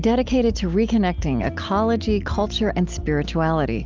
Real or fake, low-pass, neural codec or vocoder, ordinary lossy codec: real; 7.2 kHz; none; Opus, 24 kbps